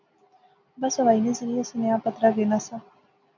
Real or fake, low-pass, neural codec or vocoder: real; 7.2 kHz; none